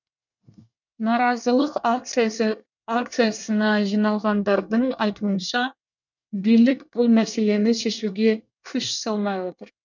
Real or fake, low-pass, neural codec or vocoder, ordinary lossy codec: fake; 7.2 kHz; codec, 24 kHz, 1 kbps, SNAC; none